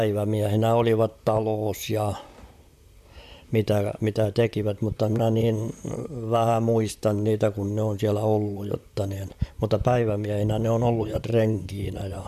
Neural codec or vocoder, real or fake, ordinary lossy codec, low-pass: vocoder, 44.1 kHz, 128 mel bands, Pupu-Vocoder; fake; none; 14.4 kHz